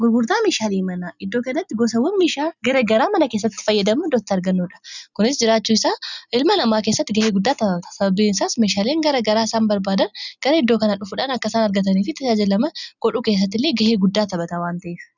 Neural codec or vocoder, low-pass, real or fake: none; 7.2 kHz; real